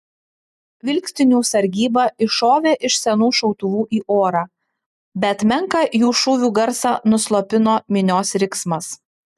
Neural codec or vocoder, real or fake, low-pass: none; real; 14.4 kHz